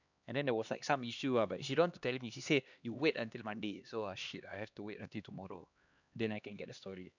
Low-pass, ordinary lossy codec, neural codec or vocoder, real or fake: 7.2 kHz; none; codec, 16 kHz, 2 kbps, X-Codec, HuBERT features, trained on LibriSpeech; fake